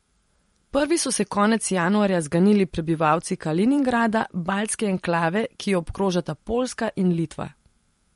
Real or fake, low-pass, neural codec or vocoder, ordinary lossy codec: fake; 19.8 kHz; vocoder, 44.1 kHz, 128 mel bands every 512 samples, BigVGAN v2; MP3, 48 kbps